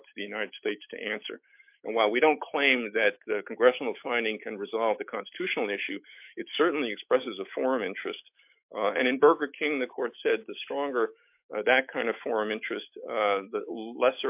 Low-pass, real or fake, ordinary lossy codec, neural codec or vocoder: 3.6 kHz; fake; MP3, 32 kbps; autoencoder, 48 kHz, 128 numbers a frame, DAC-VAE, trained on Japanese speech